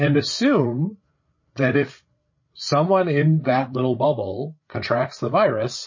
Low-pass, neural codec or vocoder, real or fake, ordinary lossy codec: 7.2 kHz; codec, 16 kHz, 8 kbps, FreqCodec, larger model; fake; MP3, 32 kbps